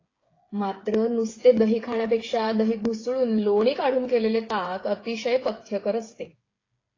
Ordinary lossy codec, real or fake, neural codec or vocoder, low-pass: AAC, 32 kbps; fake; codec, 16 kHz, 8 kbps, FreqCodec, smaller model; 7.2 kHz